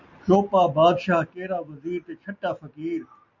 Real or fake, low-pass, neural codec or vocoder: real; 7.2 kHz; none